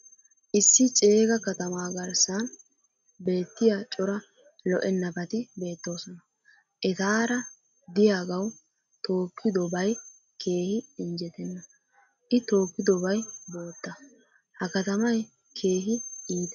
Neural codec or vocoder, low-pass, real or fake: none; 7.2 kHz; real